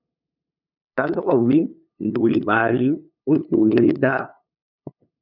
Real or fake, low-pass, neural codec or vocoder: fake; 5.4 kHz; codec, 16 kHz, 2 kbps, FunCodec, trained on LibriTTS, 25 frames a second